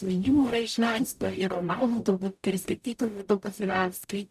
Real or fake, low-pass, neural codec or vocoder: fake; 14.4 kHz; codec, 44.1 kHz, 0.9 kbps, DAC